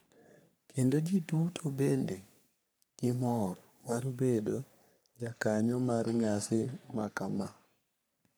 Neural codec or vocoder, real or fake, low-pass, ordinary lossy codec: codec, 44.1 kHz, 3.4 kbps, Pupu-Codec; fake; none; none